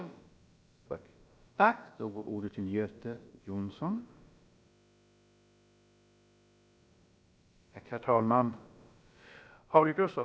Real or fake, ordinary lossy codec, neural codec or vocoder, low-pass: fake; none; codec, 16 kHz, about 1 kbps, DyCAST, with the encoder's durations; none